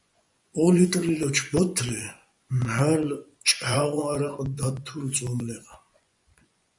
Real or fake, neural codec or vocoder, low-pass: fake; vocoder, 24 kHz, 100 mel bands, Vocos; 10.8 kHz